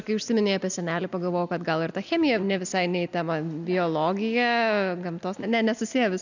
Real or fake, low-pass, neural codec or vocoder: real; 7.2 kHz; none